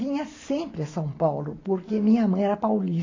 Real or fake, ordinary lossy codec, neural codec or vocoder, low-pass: real; AAC, 32 kbps; none; 7.2 kHz